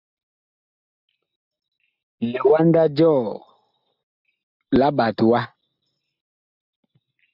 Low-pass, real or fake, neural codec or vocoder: 5.4 kHz; real; none